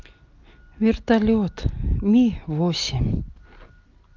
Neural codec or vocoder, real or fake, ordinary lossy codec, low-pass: none; real; Opus, 32 kbps; 7.2 kHz